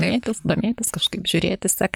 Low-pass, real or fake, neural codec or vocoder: 19.8 kHz; fake; vocoder, 44.1 kHz, 128 mel bands, Pupu-Vocoder